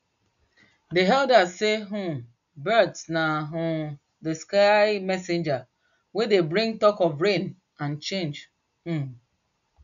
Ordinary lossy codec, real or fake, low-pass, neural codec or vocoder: none; real; 7.2 kHz; none